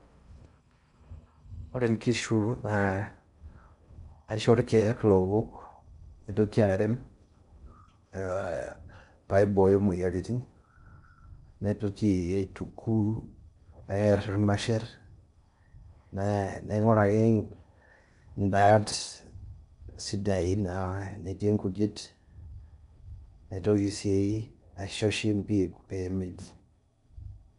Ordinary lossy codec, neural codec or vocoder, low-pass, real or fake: none; codec, 16 kHz in and 24 kHz out, 0.6 kbps, FocalCodec, streaming, 4096 codes; 10.8 kHz; fake